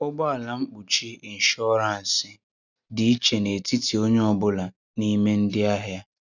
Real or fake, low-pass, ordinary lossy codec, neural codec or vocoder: real; 7.2 kHz; none; none